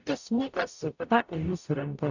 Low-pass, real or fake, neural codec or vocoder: 7.2 kHz; fake; codec, 44.1 kHz, 0.9 kbps, DAC